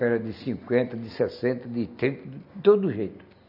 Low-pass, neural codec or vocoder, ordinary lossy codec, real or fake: 5.4 kHz; none; none; real